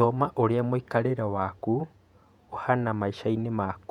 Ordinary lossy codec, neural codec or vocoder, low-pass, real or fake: none; vocoder, 48 kHz, 128 mel bands, Vocos; 19.8 kHz; fake